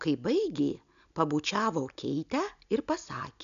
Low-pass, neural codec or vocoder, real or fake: 7.2 kHz; none; real